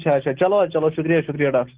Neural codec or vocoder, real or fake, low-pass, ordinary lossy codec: none; real; 3.6 kHz; Opus, 16 kbps